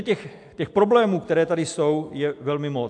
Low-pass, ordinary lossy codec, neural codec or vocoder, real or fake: 10.8 kHz; AAC, 64 kbps; none; real